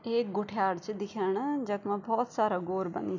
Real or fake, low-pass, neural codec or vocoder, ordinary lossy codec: real; 7.2 kHz; none; none